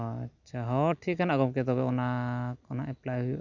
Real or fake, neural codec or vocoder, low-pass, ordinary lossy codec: real; none; 7.2 kHz; none